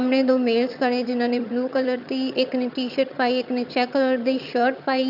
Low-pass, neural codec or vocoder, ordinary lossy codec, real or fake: 5.4 kHz; vocoder, 22.05 kHz, 80 mel bands, HiFi-GAN; none; fake